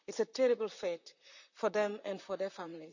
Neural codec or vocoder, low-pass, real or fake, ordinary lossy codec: vocoder, 44.1 kHz, 128 mel bands, Pupu-Vocoder; 7.2 kHz; fake; none